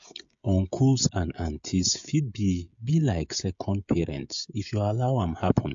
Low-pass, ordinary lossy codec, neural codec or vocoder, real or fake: 7.2 kHz; AAC, 64 kbps; codec, 16 kHz, 16 kbps, FreqCodec, smaller model; fake